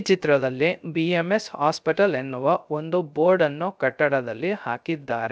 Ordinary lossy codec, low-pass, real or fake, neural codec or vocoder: none; none; fake; codec, 16 kHz, 0.3 kbps, FocalCodec